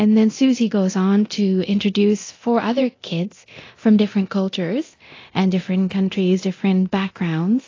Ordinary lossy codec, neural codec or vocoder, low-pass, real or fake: AAC, 32 kbps; codec, 24 kHz, 0.9 kbps, DualCodec; 7.2 kHz; fake